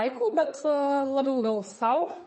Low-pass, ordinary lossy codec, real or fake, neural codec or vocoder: 10.8 kHz; MP3, 32 kbps; fake; codec, 24 kHz, 1 kbps, SNAC